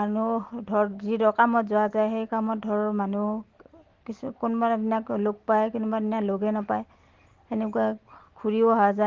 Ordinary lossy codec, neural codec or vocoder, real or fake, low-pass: Opus, 16 kbps; none; real; 7.2 kHz